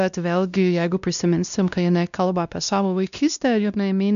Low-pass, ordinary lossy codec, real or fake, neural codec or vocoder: 7.2 kHz; MP3, 96 kbps; fake; codec, 16 kHz, 1 kbps, X-Codec, WavLM features, trained on Multilingual LibriSpeech